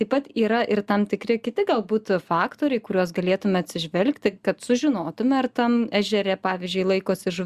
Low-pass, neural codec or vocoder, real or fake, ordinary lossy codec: 14.4 kHz; none; real; Opus, 64 kbps